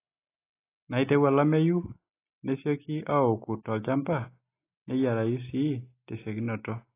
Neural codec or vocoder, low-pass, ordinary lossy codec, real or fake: none; 3.6 kHz; AAC, 24 kbps; real